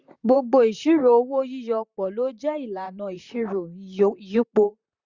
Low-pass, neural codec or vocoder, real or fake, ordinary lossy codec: 7.2 kHz; vocoder, 44.1 kHz, 128 mel bands, Pupu-Vocoder; fake; Opus, 64 kbps